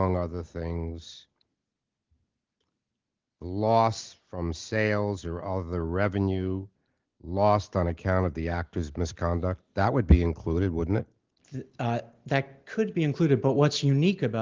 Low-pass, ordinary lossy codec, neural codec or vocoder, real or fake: 7.2 kHz; Opus, 16 kbps; none; real